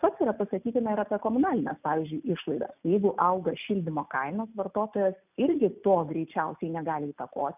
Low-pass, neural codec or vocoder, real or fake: 3.6 kHz; none; real